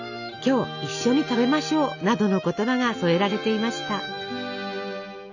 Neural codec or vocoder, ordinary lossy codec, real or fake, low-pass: none; none; real; 7.2 kHz